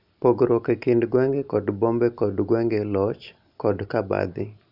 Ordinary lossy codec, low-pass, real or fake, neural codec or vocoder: none; 5.4 kHz; real; none